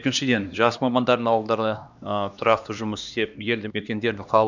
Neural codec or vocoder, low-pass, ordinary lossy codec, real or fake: codec, 16 kHz, 2 kbps, X-Codec, HuBERT features, trained on LibriSpeech; 7.2 kHz; none; fake